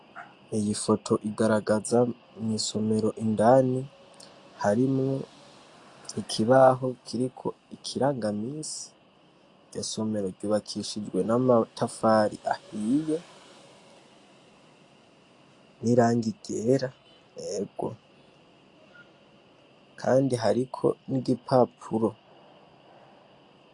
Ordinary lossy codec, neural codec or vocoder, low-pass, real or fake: AAC, 48 kbps; none; 10.8 kHz; real